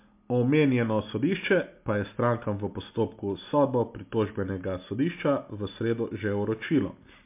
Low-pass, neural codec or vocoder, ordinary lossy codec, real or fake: 3.6 kHz; none; MP3, 32 kbps; real